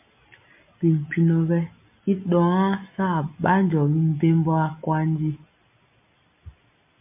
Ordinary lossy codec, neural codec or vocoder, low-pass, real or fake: MP3, 32 kbps; none; 3.6 kHz; real